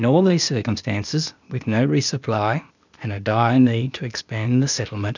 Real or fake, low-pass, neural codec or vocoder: fake; 7.2 kHz; codec, 16 kHz, 0.8 kbps, ZipCodec